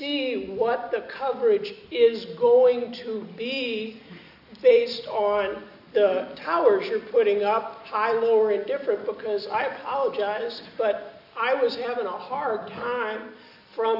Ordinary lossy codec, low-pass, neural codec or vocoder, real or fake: AAC, 48 kbps; 5.4 kHz; none; real